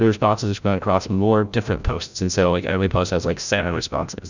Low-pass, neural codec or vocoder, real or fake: 7.2 kHz; codec, 16 kHz, 0.5 kbps, FreqCodec, larger model; fake